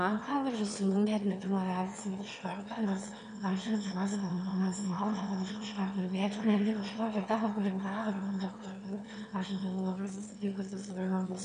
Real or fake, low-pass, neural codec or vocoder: fake; 9.9 kHz; autoencoder, 22.05 kHz, a latent of 192 numbers a frame, VITS, trained on one speaker